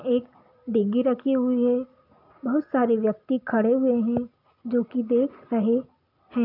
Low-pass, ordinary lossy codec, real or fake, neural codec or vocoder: 5.4 kHz; none; real; none